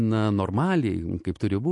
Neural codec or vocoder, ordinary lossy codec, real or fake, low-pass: none; MP3, 48 kbps; real; 10.8 kHz